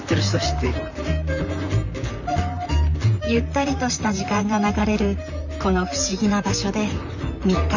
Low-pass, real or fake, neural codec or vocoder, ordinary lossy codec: 7.2 kHz; fake; vocoder, 44.1 kHz, 128 mel bands, Pupu-Vocoder; none